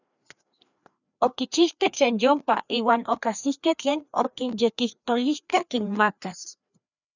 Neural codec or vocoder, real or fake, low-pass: codec, 16 kHz, 2 kbps, FreqCodec, larger model; fake; 7.2 kHz